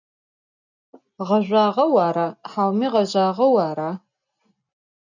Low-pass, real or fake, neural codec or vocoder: 7.2 kHz; real; none